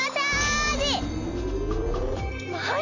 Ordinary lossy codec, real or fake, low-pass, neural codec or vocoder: none; real; 7.2 kHz; none